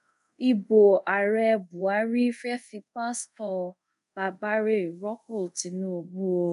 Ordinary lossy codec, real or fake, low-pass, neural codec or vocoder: none; fake; 10.8 kHz; codec, 24 kHz, 0.5 kbps, DualCodec